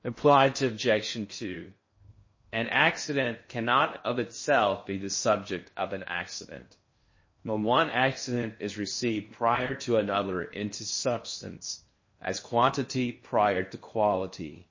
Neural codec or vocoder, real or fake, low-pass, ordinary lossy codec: codec, 16 kHz in and 24 kHz out, 0.6 kbps, FocalCodec, streaming, 4096 codes; fake; 7.2 kHz; MP3, 32 kbps